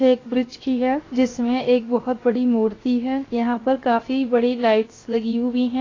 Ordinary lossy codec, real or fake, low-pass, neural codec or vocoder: AAC, 32 kbps; fake; 7.2 kHz; codec, 16 kHz, 0.7 kbps, FocalCodec